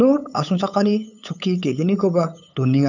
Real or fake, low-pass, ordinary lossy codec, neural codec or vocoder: fake; 7.2 kHz; none; codec, 16 kHz, 8 kbps, FunCodec, trained on LibriTTS, 25 frames a second